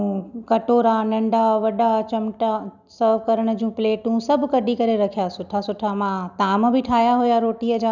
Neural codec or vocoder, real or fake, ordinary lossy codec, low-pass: none; real; none; 7.2 kHz